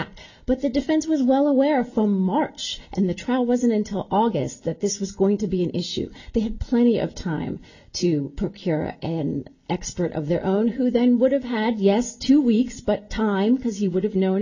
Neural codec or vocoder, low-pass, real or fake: none; 7.2 kHz; real